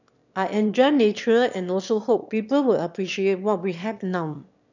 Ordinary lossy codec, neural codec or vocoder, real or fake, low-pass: none; autoencoder, 22.05 kHz, a latent of 192 numbers a frame, VITS, trained on one speaker; fake; 7.2 kHz